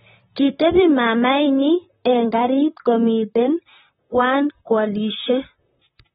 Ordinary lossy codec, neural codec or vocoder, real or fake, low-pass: AAC, 16 kbps; vocoder, 44.1 kHz, 128 mel bands, Pupu-Vocoder; fake; 19.8 kHz